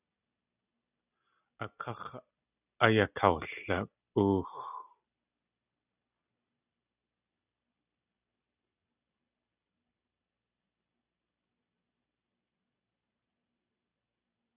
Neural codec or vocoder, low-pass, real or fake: none; 3.6 kHz; real